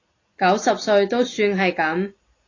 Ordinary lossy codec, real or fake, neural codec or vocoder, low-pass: AAC, 32 kbps; real; none; 7.2 kHz